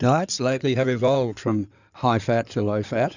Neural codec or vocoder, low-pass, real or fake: codec, 16 kHz in and 24 kHz out, 2.2 kbps, FireRedTTS-2 codec; 7.2 kHz; fake